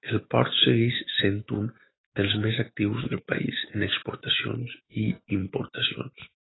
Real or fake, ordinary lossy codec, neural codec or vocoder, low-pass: fake; AAC, 16 kbps; autoencoder, 48 kHz, 128 numbers a frame, DAC-VAE, trained on Japanese speech; 7.2 kHz